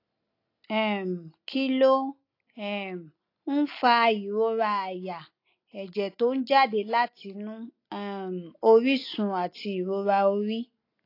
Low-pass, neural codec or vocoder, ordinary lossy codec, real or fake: 5.4 kHz; none; AAC, 32 kbps; real